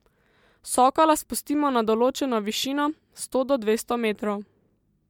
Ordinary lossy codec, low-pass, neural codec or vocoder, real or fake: MP3, 96 kbps; 19.8 kHz; none; real